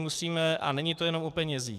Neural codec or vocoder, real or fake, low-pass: codec, 44.1 kHz, 7.8 kbps, DAC; fake; 14.4 kHz